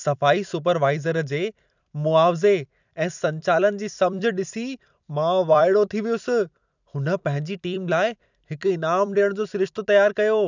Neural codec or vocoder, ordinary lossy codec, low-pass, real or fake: vocoder, 44.1 kHz, 128 mel bands every 256 samples, BigVGAN v2; none; 7.2 kHz; fake